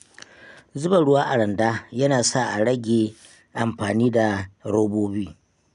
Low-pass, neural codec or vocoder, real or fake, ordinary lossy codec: 10.8 kHz; none; real; none